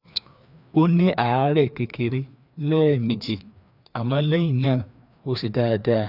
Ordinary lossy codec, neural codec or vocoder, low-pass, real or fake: none; codec, 16 kHz, 2 kbps, FreqCodec, larger model; 5.4 kHz; fake